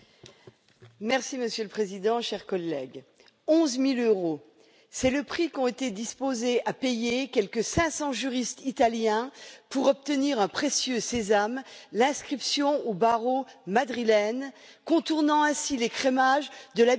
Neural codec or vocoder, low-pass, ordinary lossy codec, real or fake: none; none; none; real